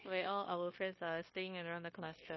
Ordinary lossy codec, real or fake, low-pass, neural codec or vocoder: MP3, 24 kbps; fake; 7.2 kHz; codec, 16 kHz, 0.9 kbps, LongCat-Audio-Codec